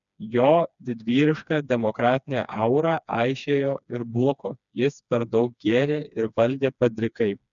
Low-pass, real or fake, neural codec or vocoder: 7.2 kHz; fake; codec, 16 kHz, 2 kbps, FreqCodec, smaller model